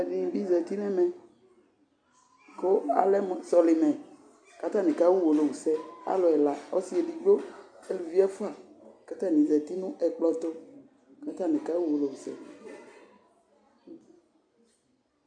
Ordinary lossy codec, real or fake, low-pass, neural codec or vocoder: AAC, 64 kbps; real; 9.9 kHz; none